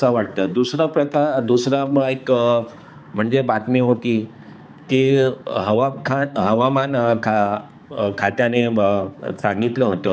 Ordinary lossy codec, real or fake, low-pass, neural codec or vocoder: none; fake; none; codec, 16 kHz, 4 kbps, X-Codec, HuBERT features, trained on balanced general audio